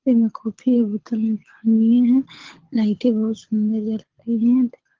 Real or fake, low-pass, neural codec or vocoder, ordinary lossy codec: fake; 7.2 kHz; codec, 24 kHz, 6 kbps, HILCodec; Opus, 16 kbps